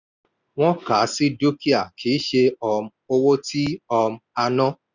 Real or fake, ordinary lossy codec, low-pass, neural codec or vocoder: real; none; 7.2 kHz; none